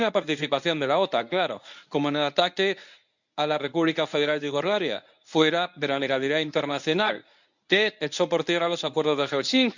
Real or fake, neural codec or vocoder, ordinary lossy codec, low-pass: fake; codec, 24 kHz, 0.9 kbps, WavTokenizer, medium speech release version 1; none; 7.2 kHz